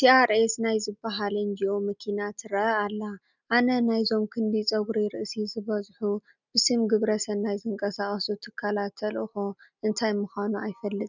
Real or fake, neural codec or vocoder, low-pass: real; none; 7.2 kHz